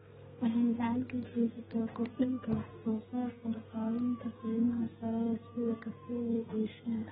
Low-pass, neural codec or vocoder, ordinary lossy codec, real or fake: 14.4 kHz; codec, 32 kHz, 1.9 kbps, SNAC; AAC, 16 kbps; fake